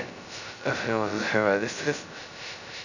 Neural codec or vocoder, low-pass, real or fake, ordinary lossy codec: codec, 16 kHz, 0.2 kbps, FocalCodec; 7.2 kHz; fake; none